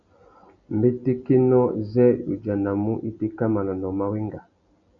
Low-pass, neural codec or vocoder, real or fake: 7.2 kHz; none; real